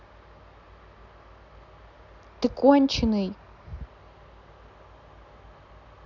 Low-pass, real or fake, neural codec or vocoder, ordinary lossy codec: 7.2 kHz; real; none; none